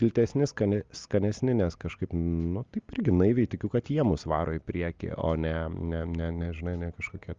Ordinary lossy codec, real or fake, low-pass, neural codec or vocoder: Opus, 32 kbps; real; 7.2 kHz; none